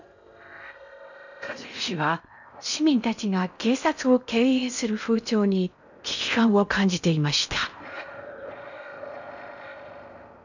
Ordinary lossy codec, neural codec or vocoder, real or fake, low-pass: none; codec, 16 kHz in and 24 kHz out, 0.6 kbps, FocalCodec, streaming, 4096 codes; fake; 7.2 kHz